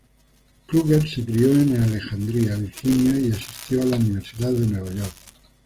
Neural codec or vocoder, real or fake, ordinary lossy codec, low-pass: none; real; Opus, 24 kbps; 14.4 kHz